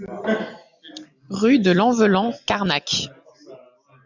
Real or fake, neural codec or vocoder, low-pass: real; none; 7.2 kHz